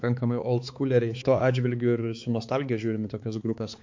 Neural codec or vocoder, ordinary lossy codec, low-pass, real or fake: codec, 16 kHz, 4 kbps, X-Codec, HuBERT features, trained on balanced general audio; MP3, 48 kbps; 7.2 kHz; fake